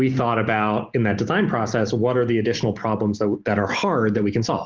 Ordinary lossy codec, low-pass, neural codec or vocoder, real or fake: Opus, 16 kbps; 7.2 kHz; none; real